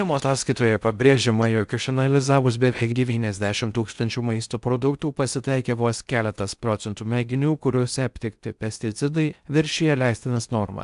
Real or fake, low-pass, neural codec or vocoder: fake; 10.8 kHz; codec, 16 kHz in and 24 kHz out, 0.6 kbps, FocalCodec, streaming, 4096 codes